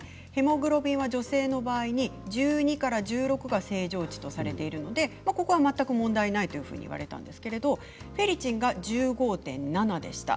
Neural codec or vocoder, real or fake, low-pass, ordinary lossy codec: none; real; none; none